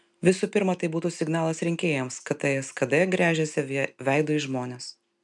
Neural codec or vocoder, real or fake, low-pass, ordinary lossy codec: none; real; 10.8 kHz; MP3, 96 kbps